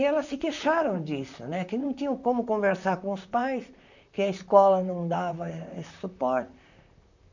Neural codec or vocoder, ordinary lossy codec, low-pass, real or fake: vocoder, 44.1 kHz, 128 mel bands, Pupu-Vocoder; none; 7.2 kHz; fake